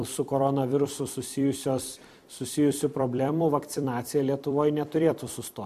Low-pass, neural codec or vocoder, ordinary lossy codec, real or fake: 14.4 kHz; vocoder, 44.1 kHz, 128 mel bands every 256 samples, BigVGAN v2; MP3, 64 kbps; fake